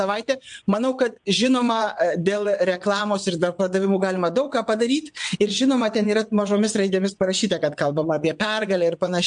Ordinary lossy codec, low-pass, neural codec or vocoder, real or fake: MP3, 64 kbps; 9.9 kHz; vocoder, 22.05 kHz, 80 mel bands, WaveNeXt; fake